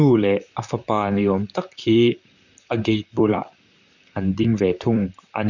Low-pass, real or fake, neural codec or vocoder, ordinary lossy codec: 7.2 kHz; fake; vocoder, 44.1 kHz, 128 mel bands, Pupu-Vocoder; none